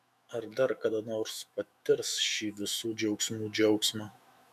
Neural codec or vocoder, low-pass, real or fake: autoencoder, 48 kHz, 128 numbers a frame, DAC-VAE, trained on Japanese speech; 14.4 kHz; fake